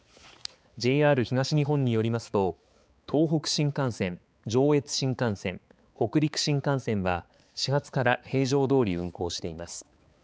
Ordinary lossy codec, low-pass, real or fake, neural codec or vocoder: none; none; fake; codec, 16 kHz, 4 kbps, X-Codec, HuBERT features, trained on balanced general audio